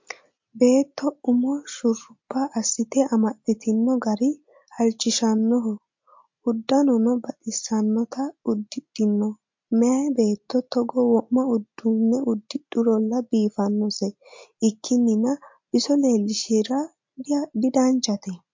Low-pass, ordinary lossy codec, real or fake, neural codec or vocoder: 7.2 kHz; MP3, 48 kbps; real; none